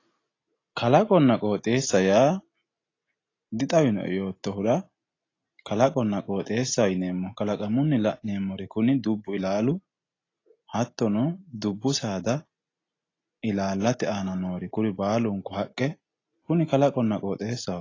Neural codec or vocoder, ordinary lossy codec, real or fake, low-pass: none; AAC, 32 kbps; real; 7.2 kHz